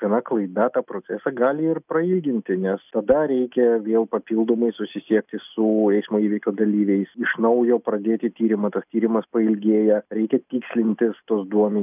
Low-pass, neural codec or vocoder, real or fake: 3.6 kHz; none; real